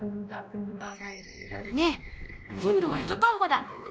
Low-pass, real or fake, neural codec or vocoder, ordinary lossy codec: none; fake; codec, 16 kHz, 1 kbps, X-Codec, WavLM features, trained on Multilingual LibriSpeech; none